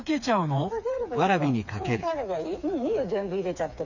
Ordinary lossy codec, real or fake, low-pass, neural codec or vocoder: none; fake; 7.2 kHz; codec, 16 kHz, 4 kbps, FreqCodec, smaller model